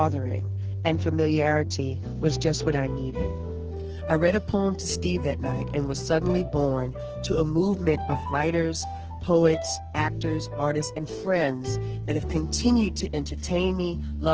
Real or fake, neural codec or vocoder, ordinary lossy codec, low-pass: fake; codec, 44.1 kHz, 2.6 kbps, SNAC; Opus, 16 kbps; 7.2 kHz